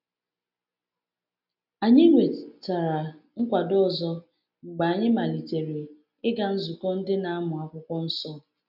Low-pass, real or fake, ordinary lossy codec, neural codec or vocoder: 5.4 kHz; real; none; none